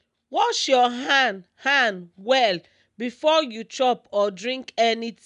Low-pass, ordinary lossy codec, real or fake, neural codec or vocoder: 10.8 kHz; none; real; none